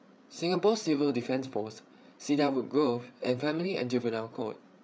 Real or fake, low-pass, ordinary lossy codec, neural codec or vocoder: fake; none; none; codec, 16 kHz, 8 kbps, FreqCodec, larger model